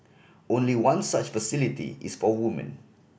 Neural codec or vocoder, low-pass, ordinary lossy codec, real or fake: none; none; none; real